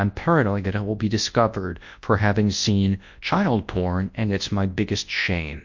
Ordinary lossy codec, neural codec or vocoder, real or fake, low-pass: MP3, 48 kbps; codec, 24 kHz, 0.9 kbps, WavTokenizer, large speech release; fake; 7.2 kHz